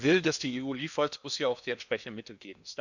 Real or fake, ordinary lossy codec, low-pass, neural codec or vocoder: fake; none; 7.2 kHz; codec, 16 kHz in and 24 kHz out, 0.8 kbps, FocalCodec, streaming, 65536 codes